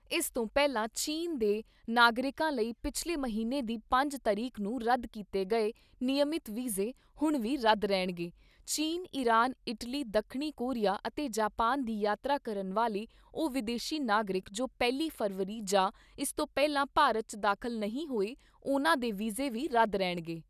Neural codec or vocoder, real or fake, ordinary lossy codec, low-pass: none; real; none; 14.4 kHz